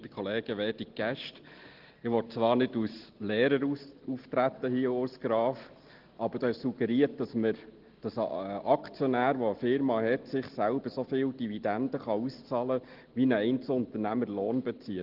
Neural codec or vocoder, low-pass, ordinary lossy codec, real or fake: none; 5.4 kHz; Opus, 16 kbps; real